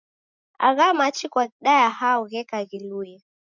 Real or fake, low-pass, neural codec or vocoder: real; 7.2 kHz; none